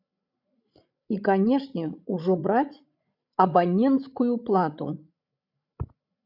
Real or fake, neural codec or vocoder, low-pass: fake; codec, 16 kHz, 16 kbps, FreqCodec, larger model; 5.4 kHz